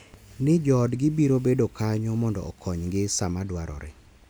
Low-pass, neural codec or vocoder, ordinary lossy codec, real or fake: none; none; none; real